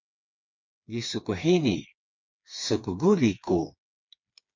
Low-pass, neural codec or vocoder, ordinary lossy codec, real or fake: 7.2 kHz; codec, 16 kHz, 4 kbps, FreqCodec, smaller model; MP3, 64 kbps; fake